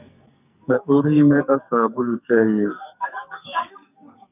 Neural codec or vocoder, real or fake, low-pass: codec, 44.1 kHz, 2.6 kbps, SNAC; fake; 3.6 kHz